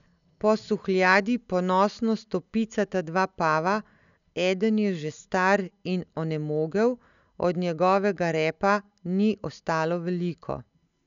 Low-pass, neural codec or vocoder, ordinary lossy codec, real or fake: 7.2 kHz; none; none; real